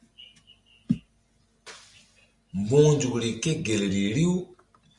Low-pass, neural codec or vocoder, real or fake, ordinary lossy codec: 10.8 kHz; none; real; Opus, 64 kbps